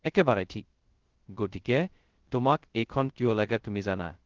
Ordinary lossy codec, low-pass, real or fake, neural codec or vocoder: Opus, 16 kbps; 7.2 kHz; fake; codec, 16 kHz, 0.2 kbps, FocalCodec